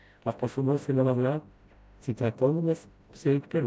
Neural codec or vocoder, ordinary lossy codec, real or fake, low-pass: codec, 16 kHz, 0.5 kbps, FreqCodec, smaller model; none; fake; none